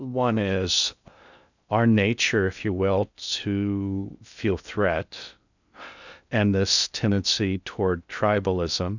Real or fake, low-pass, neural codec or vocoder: fake; 7.2 kHz; codec, 16 kHz in and 24 kHz out, 0.6 kbps, FocalCodec, streaming, 2048 codes